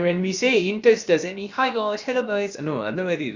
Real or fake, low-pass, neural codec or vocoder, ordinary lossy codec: fake; 7.2 kHz; codec, 16 kHz, about 1 kbps, DyCAST, with the encoder's durations; Opus, 64 kbps